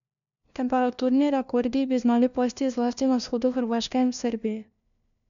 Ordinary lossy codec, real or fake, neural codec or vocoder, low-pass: none; fake; codec, 16 kHz, 1 kbps, FunCodec, trained on LibriTTS, 50 frames a second; 7.2 kHz